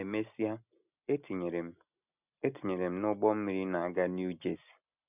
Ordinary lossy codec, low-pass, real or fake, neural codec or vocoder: none; 3.6 kHz; real; none